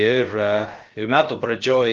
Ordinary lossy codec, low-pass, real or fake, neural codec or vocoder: Opus, 16 kbps; 7.2 kHz; fake; codec, 16 kHz, about 1 kbps, DyCAST, with the encoder's durations